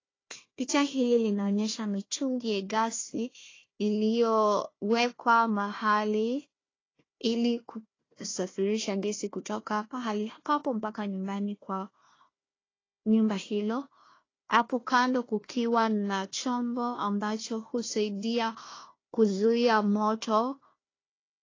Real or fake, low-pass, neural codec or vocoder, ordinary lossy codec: fake; 7.2 kHz; codec, 16 kHz, 1 kbps, FunCodec, trained on Chinese and English, 50 frames a second; AAC, 32 kbps